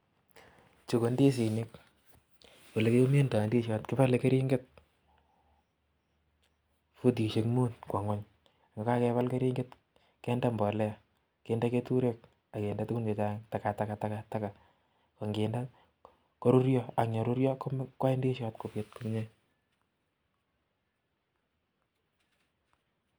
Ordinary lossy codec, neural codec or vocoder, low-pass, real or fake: none; none; none; real